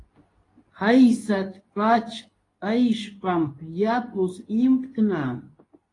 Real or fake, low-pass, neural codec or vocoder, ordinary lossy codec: fake; 10.8 kHz; codec, 24 kHz, 0.9 kbps, WavTokenizer, medium speech release version 2; AAC, 32 kbps